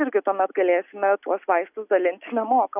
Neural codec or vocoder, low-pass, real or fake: none; 3.6 kHz; real